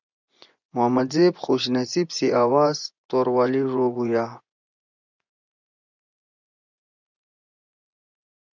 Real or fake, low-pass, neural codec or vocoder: fake; 7.2 kHz; vocoder, 24 kHz, 100 mel bands, Vocos